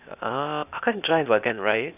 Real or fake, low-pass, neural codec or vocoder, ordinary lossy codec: fake; 3.6 kHz; codec, 16 kHz, 0.8 kbps, ZipCodec; none